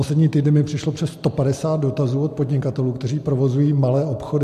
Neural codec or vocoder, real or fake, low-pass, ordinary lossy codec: none; real; 14.4 kHz; MP3, 64 kbps